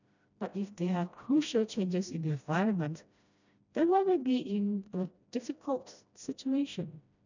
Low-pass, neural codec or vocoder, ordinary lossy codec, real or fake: 7.2 kHz; codec, 16 kHz, 1 kbps, FreqCodec, smaller model; none; fake